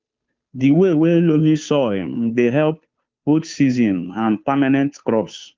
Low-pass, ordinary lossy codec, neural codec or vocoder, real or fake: 7.2 kHz; Opus, 24 kbps; codec, 16 kHz, 2 kbps, FunCodec, trained on Chinese and English, 25 frames a second; fake